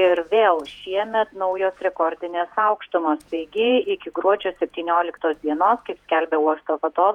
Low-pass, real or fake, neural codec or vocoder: 19.8 kHz; fake; vocoder, 48 kHz, 128 mel bands, Vocos